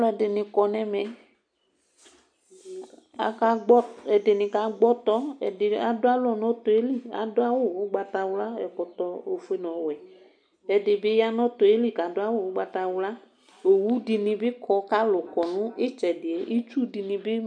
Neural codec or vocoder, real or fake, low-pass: none; real; 9.9 kHz